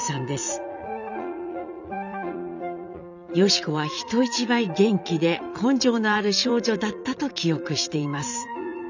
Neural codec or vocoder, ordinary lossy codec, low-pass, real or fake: vocoder, 44.1 kHz, 80 mel bands, Vocos; none; 7.2 kHz; fake